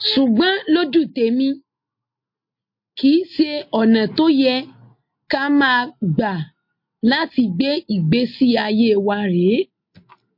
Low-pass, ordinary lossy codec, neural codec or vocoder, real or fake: 5.4 kHz; MP3, 32 kbps; none; real